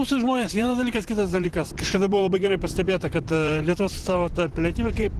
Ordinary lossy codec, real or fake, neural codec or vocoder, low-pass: Opus, 16 kbps; fake; vocoder, 44.1 kHz, 128 mel bands, Pupu-Vocoder; 14.4 kHz